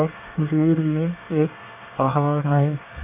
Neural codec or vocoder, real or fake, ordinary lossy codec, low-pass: codec, 24 kHz, 1 kbps, SNAC; fake; AAC, 32 kbps; 3.6 kHz